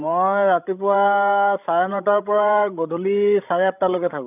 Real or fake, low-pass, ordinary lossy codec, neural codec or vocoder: fake; 3.6 kHz; none; codec, 16 kHz, 16 kbps, FreqCodec, larger model